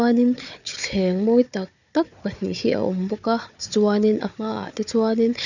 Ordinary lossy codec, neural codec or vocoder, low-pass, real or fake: none; codec, 16 kHz, 4 kbps, FunCodec, trained on Chinese and English, 50 frames a second; 7.2 kHz; fake